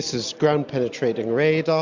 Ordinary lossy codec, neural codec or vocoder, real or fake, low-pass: MP3, 64 kbps; none; real; 7.2 kHz